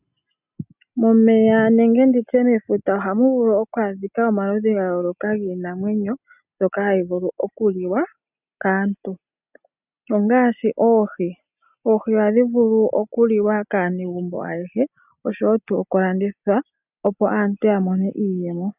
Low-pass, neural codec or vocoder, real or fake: 3.6 kHz; none; real